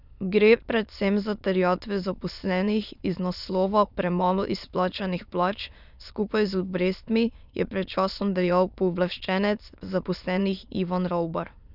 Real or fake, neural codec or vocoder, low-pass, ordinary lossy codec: fake; autoencoder, 22.05 kHz, a latent of 192 numbers a frame, VITS, trained on many speakers; 5.4 kHz; none